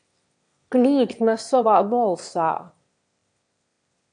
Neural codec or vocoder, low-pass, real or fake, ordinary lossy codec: autoencoder, 22.05 kHz, a latent of 192 numbers a frame, VITS, trained on one speaker; 9.9 kHz; fake; AAC, 64 kbps